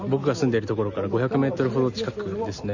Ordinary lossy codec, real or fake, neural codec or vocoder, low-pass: none; real; none; 7.2 kHz